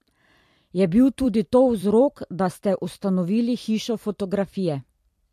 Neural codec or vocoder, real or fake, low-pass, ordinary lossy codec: vocoder, 44.1 kHz, 128 mel bands every 256 samples, BigVGAN v2; fake; 14.4 kHz; MP3, 64 kbps